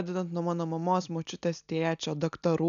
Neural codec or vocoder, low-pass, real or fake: none; 7.2 kHz; real